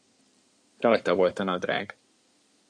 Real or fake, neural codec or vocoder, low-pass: fake; codec, 16 kHz in and 24 kHz out, 2.2 kbps, FireRedTTS-2 codec; 9.9 kHz